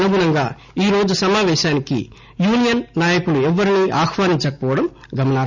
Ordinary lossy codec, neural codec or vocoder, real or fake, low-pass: none; none; real; 7.2 kHz